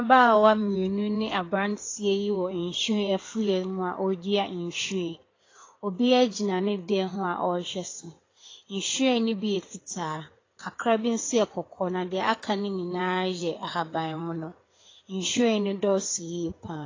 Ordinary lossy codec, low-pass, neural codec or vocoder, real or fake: AAC, 32 kbps; 7.2 kHz; codec, 16 kHz in and 24 kHz out, 2.2 kbps, FireRedTTS-2 codec; fake